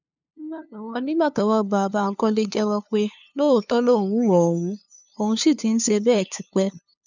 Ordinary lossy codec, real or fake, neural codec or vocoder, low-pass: none; fake; codec, 16 kHz, 2 kbps, FunCodec, trained on LibriTTS, 25 frames a second; 7.2 kHz